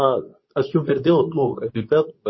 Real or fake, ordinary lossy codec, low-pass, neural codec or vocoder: fake; MP3, 24 kbps; 7.2 kHz; codec, 24 kHz, 0.9 kbps, WavTokenizer, medium speech release version 2